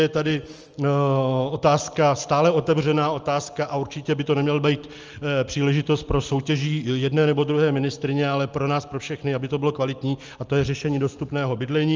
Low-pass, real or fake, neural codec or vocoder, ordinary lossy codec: 7.2 kHz; real; none; Opus, 24 kbps